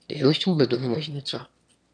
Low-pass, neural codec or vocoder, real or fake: 9.9 kHz; autoencoder, 22.05 kHz, a latent of 192 numbers a frame, VITS, trained on one speaker; fake